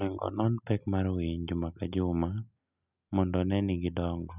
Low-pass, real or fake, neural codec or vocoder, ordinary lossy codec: 3.6 kHz; real; none; none